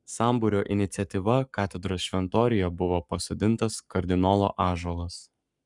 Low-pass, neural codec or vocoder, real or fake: 10.8 kHz; codec, 44.1 kHz, 7.8 kbps, Pupu-Codec; fake